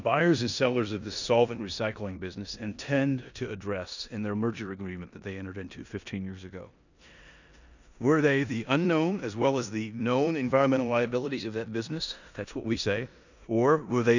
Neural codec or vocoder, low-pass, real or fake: codec, 16 kHz in and 24 kHz out, 0.9 kbps, LongCat-Audio-Codec, four codebook decoder; 7.2 kHz; fake